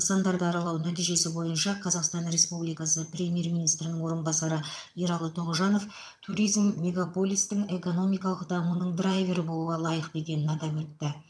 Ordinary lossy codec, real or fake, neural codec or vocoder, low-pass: none; fake; vocoder, 22.05 kHz, 80 mel bands, HiFi-GAN; none